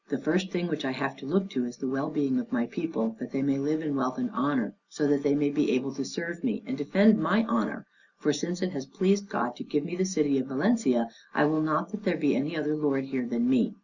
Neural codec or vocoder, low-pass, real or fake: none; 7.2 kHz; real